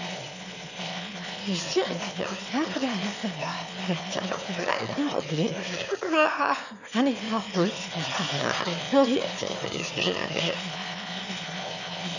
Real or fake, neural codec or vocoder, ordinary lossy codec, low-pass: fake; autoencoder, 22.05 kHz, a latent of 192 numbers a frame, VITS, trained on one speaker; none; 7.2 kHz